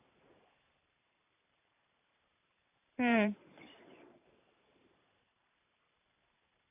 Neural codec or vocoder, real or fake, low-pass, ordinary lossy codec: vocoder, 44.1 kHz, 128 mel bands every 512 samples, BigVGAN v2; fake; 3.6 kHz; AAC, 24 kbps